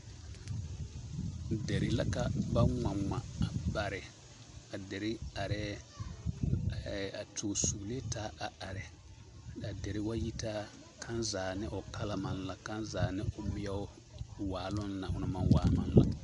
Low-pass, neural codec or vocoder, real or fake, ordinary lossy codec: 10.8 kHz; none; real; MP3, 64 kbps